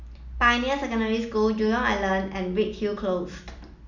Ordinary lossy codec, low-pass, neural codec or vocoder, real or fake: none; 7.2 kHz; none; real